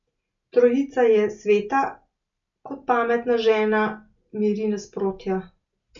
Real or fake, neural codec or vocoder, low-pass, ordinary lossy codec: real; none; 7.2 kHz; none